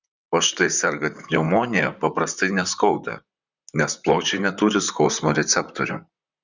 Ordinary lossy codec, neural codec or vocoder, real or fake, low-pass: Opus, 64 kbps; vocoder, 44.1 kHz, 128 mel bands, Pupu-Vocoder; fake; 7.2 kHz